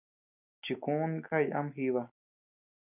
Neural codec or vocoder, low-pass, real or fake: none; 3.6 kHz; real